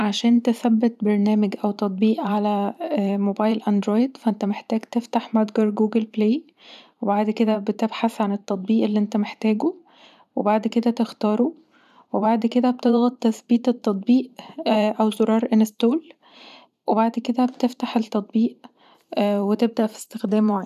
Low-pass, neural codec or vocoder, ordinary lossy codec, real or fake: 14.4 kHz; vocoder, 44.1 kHz, 128 mel bands every 512 samples, BigVGAN v2; none; fake